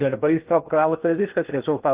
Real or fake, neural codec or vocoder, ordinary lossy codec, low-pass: fake; codec, 16 kHz in and 24 kHz out, 0.6 kbps, FocalCodec, streaming, 2048 codes; Opus, 24 kbps; 3.6 kHz